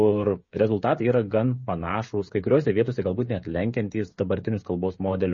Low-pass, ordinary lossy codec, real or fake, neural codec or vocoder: 7.2 kHz; MP3, 32 kbps; fake; codec, 16 kHz, 16 kbps, FreqCodec, smaller model